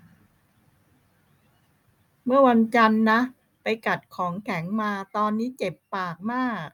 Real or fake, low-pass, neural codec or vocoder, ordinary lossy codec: real; 19.8 kHz; none; none